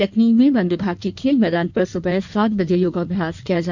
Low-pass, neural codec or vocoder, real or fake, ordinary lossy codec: 7.2 kHz; codec, 16 kHz in and 24 kHz out, 1.1 kbps, FireRedTTS-2 codec; fake; none